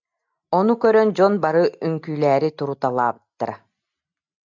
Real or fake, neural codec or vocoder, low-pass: real; none; 7.2 kHz